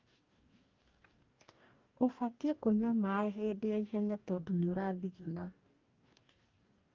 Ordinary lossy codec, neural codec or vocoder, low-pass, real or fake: Opus, 32 kbps; codec, 44.1 kHz, 2.6 kbps, DAC; 7.2 kHz; fake